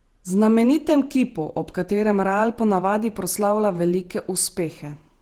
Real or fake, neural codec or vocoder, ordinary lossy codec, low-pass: fake; vocoder, 48 kHz, 128 mel bands, Vocos; Opus, 16 kbps; 19.8 kHz